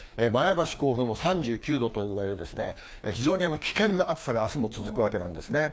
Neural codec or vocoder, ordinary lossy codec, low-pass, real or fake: codec, 16 kHz, 2 kbps, FreqCodec, larger model; none; none; fake